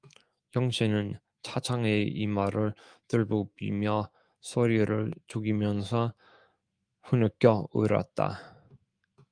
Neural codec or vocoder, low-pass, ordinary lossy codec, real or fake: autoencoder, 48 kHz, 128 numbers a frame, DAC-VAE, trained on Japanese speech; 9.9 kHz; Opus, 32 kbps; fake